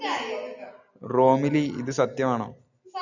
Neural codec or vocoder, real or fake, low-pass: none; real; 7.2 kHz